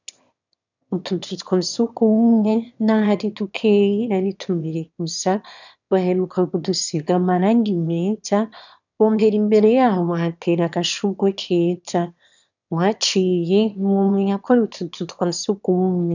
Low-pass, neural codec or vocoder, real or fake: 7.2 kHz; autoencoder, 22.05 kHz, a latent of 192 numbers a frame, VITS, trained on one speaker; fake